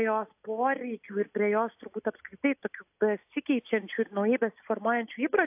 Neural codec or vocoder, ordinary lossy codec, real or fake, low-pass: none; AAC, 32 kbps; real; 3.6 kHz